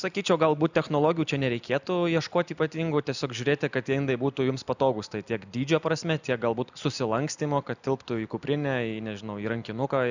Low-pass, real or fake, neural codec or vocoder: 7.2 kHz; real; none